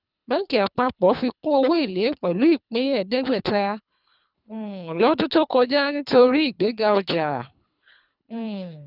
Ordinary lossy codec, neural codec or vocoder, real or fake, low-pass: none; codec, 24 kHz, 3 kbps, HILCodec; fake; 5.4 kHz